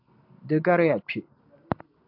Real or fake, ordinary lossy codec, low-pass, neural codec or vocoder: real; AAC, 48 kbps; 5.4 kHz; none